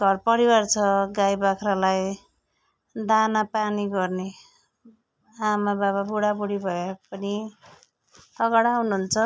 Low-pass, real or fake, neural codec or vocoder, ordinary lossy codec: none; real; none; none